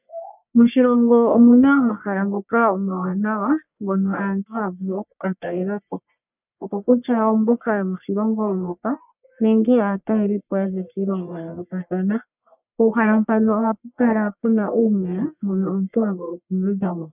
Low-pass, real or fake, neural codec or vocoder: 3.6 kHz; fake; codec, 44.1 kHz, 1.7 kbps, Pupu-Codec